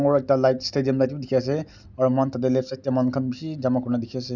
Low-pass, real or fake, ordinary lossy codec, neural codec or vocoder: 7.2 kHz; real; none; none